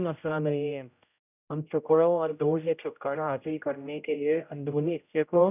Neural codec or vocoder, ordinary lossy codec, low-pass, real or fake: codec, 16 kHz, 0.5 kbps, X-Codec, HuBERT features, trained on general audio; none; 3.6 kHz; fake